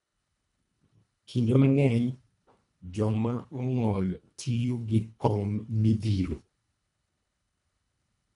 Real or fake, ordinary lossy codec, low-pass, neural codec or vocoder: fake; none; 10.8 kHz; codec, 24 kHz, 1.5 kbps, HILCodec